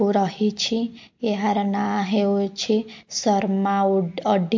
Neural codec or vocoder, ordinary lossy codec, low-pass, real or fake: none; MP3, 48 kbps; 7.2 kHz; real